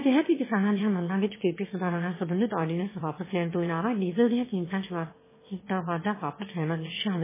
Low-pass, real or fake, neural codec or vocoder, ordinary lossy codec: 3.6 kHz; fake; autoencoder, 22.05 kHz, a latent of 192 numbers a frame, VITS, trained on one speaker; MP3, 16 kbps